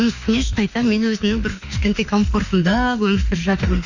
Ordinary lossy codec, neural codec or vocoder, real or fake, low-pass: none; autoencoder, 48 kHz, 32 numbers a frame, DAC-VAE, trained on Japanese speech; fake; 7.2 kHz